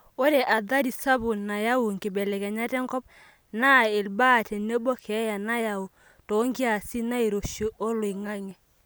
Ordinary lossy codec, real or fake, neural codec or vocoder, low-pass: none; real; none; none